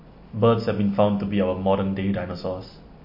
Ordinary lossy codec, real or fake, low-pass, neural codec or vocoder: MP3, 32 kbps; real; 5.4 kHz; none